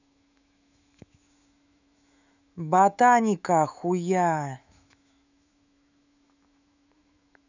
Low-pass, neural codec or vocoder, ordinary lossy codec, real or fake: 7.2 kHz; autoencoder, 48 kHz, 128 numbers a frame, DAC-VAE, trained on Japanese speech; none; fake